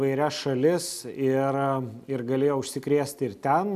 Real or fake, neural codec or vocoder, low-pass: real; none; 14.4 kHz